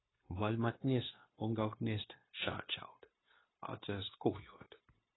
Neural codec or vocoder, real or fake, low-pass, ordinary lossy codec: codec, 16 kHz, 0.9 kbps, LongCat-Audio-Codec; fake; 7.2 kHz; AAC, 16 kbps